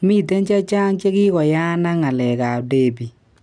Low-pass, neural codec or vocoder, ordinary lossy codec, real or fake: 9.9 kHz; none; none; real